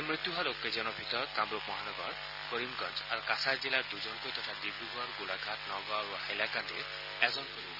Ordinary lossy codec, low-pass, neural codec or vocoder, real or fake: none; 5.4 kHz; none; real